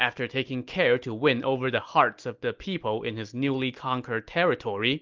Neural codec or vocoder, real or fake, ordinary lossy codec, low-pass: none; real; Opus, 24 kbps; 7.2 kHz